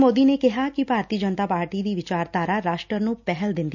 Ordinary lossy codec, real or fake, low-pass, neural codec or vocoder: none; real; 7.2 kHz; none